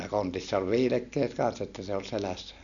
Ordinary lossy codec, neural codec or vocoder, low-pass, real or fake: none; none; 7.2 kHz; real